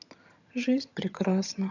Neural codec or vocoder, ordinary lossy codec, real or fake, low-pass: vocoder, 22.05 kHz, 80 mel bands, HiFi-GAN; none; fake; 7.2 kHz